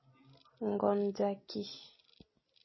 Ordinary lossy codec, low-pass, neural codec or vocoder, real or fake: MP3, 24 kbps; 7.2 kHz; none; real